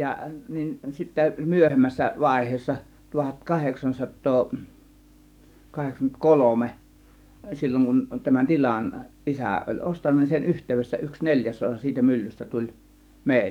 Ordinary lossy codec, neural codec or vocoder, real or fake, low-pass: none; codec, 44.1 kHz, 7.8 kbps, DAC; fake; 19.8 kHz